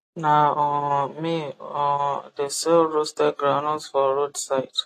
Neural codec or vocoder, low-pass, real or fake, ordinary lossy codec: none; 14.4 kHz; real; AAC, 48 kbps